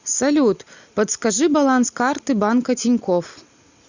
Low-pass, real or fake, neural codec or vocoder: 7.2 kHz; real; none